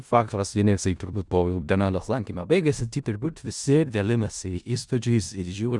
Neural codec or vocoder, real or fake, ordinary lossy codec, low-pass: codec, 16 kHz in and 24 kHz out, 0.4 kbps, LongCat-Audio-Codec, four codebook decoder; fake; Opus, 64 kbps; 10.8 kHz